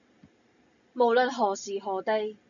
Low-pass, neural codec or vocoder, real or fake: 7.2 kHz; none; real